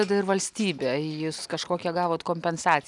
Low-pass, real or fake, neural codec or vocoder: 10.8 kHz; real; none